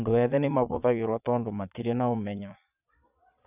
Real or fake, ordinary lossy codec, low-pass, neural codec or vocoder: fake; none; 3.6 kHz; vocoder, 22.05 kHz, 80 mel bands, Vocos